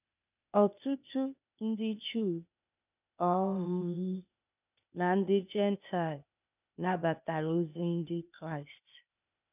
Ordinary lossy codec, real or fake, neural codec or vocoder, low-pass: none; fake; codec, 16 kHz, 0.8 kbps, ZipCodec; 3.6 kHz